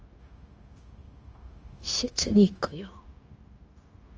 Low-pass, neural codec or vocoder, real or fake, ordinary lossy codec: 7.2 kHz; codec, 16 kHz, 0.9 kbps, LongCat-Audio-Codec; fake; Opus, 24 kbps